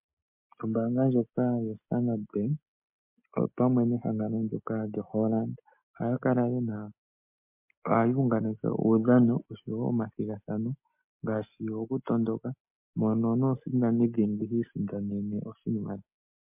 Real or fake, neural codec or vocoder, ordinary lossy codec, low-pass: real; none; MP3, 32 kbps; 3.6 kHz